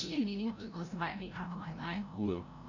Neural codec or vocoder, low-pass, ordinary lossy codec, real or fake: codec, 16 kHz, 0.5 kbps, FreqCodec, larger model; 7.2 kHz; none; fake